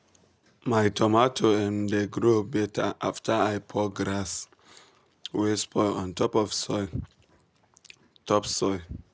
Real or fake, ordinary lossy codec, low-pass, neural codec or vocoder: real; none; none; none